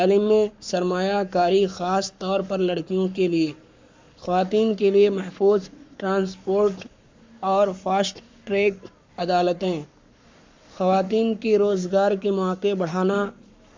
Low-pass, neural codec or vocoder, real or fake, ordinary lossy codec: 7.2 kHz; codec, 44.1 kHz, 7.8 kbps, Pupu-Codec; fake; MP3, 64 kbps